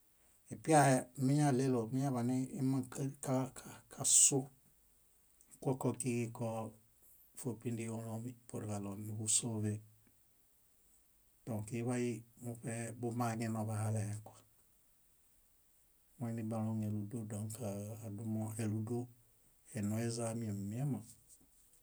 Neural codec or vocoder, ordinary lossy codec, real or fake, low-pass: none; none; real; none